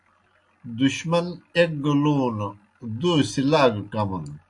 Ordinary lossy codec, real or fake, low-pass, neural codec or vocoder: AAC, 64 kbps; real; 10.8 kHz; none